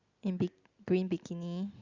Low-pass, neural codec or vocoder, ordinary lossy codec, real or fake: 7.2 kHz; none; Opus, 64 kbps; real